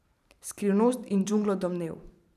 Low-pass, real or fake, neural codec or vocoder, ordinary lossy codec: 14.4 kHz; fake; vocoder, 44.1 kHz, 128 mel bands every 512 samples, BigVGAN v2; none